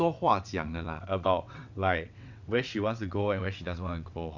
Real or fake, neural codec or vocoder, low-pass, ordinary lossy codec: fake; vocoder, 22.05 kHz, 80 mel bands, WaveNeXt; 7.2 kHz; none